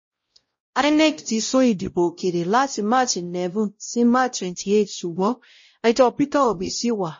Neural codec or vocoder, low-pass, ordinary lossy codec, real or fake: codec, 16 kHz, 0.5 kbps, X-Codec, WavLM features, trained on Multilingual LibriSpeech; 7.2 kHz; MP3, 32 kbps; fake